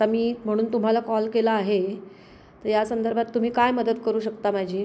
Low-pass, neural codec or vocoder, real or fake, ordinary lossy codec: none; none; real; none